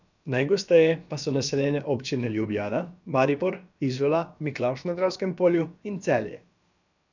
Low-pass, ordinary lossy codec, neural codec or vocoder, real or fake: 7.2 kHz; none; codec, 16 kHz, about 1 kbps, DyCAST, with the encoder's durations; fake